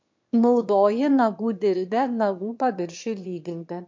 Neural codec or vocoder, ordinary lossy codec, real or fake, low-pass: autoencoder, 22.05 kHz, a latent of 192 numbers a frame, VITS, trained on one speaker; MP3, 48 kbps; fake; 7.2 kHz